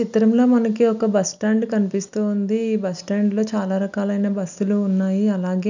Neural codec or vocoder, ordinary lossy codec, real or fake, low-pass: none; none; real; 7.2 kHz